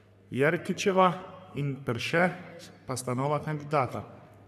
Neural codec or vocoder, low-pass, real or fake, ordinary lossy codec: codec, 44.1 kHz, 3.4 kbps, Pupu-Codec; 14.4 kHz; fake; none